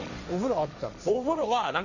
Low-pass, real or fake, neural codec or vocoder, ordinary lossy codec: 7.2 kHz; fake; codec, 16 kHz, 1.1 kbps, Voila-Tokenizer; none